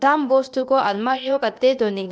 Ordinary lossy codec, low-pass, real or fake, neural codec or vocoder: none; none; fake; codec, 16 kHz, 0.8 kbps, ZipCodec